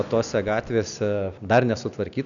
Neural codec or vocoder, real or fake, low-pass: none; real; 7.2 kHz